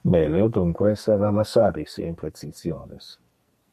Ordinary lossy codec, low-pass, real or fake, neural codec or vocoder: MP3, 64 kbps; 14.4 kHz; fake; codec, 44.1 kHz, 2.6 kbps, SNAC